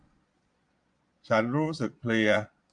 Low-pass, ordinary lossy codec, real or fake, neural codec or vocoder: 9.9 kHz; MP3, 64 kbps; fake; vocoder, 22.05 kHz, 80 mel bands, Vocos